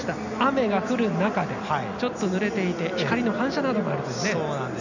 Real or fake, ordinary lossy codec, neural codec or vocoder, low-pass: real; none; none; 7.2 kHz